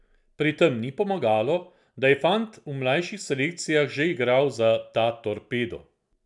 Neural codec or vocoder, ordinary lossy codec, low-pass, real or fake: none; none; 10.8 kHz; real